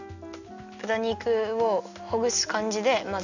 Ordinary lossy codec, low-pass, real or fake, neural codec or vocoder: none; 7.2 kHz; real; none